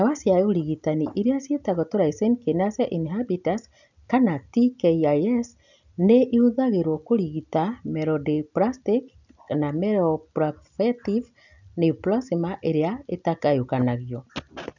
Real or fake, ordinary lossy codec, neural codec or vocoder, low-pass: real; none; none; 7.2 kHz